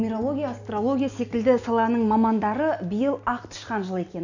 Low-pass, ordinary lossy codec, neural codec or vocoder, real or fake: 7.2 kHz; none; none; real